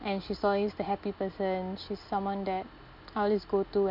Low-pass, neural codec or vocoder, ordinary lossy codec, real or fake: 5.4 kHz; none; none; real